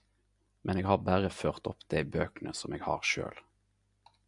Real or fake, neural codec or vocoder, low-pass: real; none; 10.8 kHz